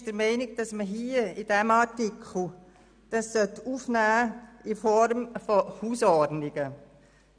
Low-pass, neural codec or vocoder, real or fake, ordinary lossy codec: 9.9 kHz; none; real; none